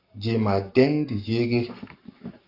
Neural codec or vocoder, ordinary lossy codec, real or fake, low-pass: codec, 44.1 kHz, 7.8 kbps, Pupu-Codec; AAC, 32 kbps; fake; 5.4 kHz